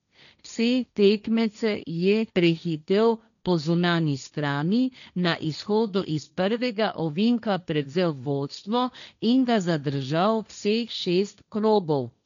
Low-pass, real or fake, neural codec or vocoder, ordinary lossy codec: 7.2 kHz; fake; codec, 16 kHz, 1.1 kbps, Voila-Tokenizer; none